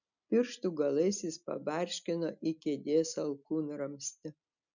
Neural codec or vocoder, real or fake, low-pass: none; real; 7.2 kHz